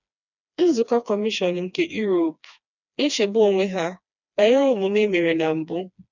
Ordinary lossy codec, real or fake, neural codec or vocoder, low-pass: none; fake; codec, 16 kHz, 2 kbps, FreqCodec, smaller model; 7.2 kHz